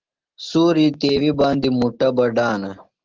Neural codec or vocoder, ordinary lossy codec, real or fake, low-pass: none; Opus, 16 kbps; real; 7.2 kHz